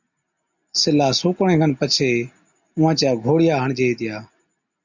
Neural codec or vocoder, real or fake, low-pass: none; real; 7.2 kHz